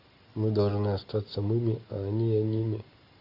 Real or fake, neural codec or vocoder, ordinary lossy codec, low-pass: real; none; AAC, 32 kbps; 5.4 kHz